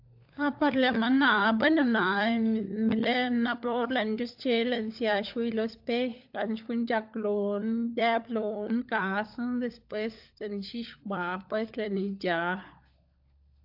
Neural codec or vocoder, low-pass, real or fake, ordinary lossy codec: codec, 16 kHz, 16 kbps, FunCodec, trained on LibriTTS, 50 frames a second; 5.4 kHz; fake; AAC, 48 kbps